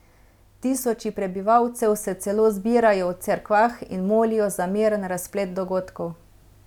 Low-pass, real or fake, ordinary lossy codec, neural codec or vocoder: 19.8 kHz; real; none; none